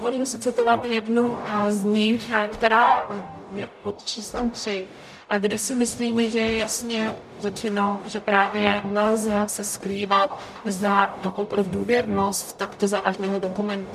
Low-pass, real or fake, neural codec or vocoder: 14.4 kHz; fake; codec, 44.1 kHz, 0.9 kbps, DAC